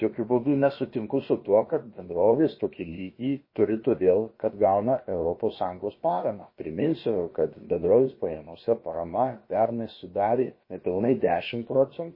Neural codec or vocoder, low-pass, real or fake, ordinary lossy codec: codec, 16 kHz, about 1 kbps, DyCAST, with the encoder's durations; 5.4 kHz; fake; MP3, 24 kbps